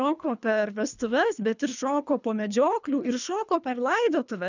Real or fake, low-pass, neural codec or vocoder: fake; 7.2 kHz; codec, 24 kHz, 3 kbps, HILCodec